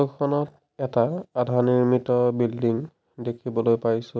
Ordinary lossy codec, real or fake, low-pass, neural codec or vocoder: none; real; none; none